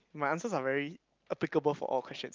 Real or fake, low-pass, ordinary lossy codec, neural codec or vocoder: real; 7.2 kHz; Opus, 24 kbps; none